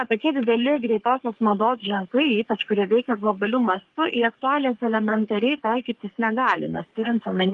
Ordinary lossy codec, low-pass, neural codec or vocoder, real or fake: Opus, 24 kbps; 10.8 kHz; codec, 44.1 kHz, 3.4 kbps, Pupu-Codec; fake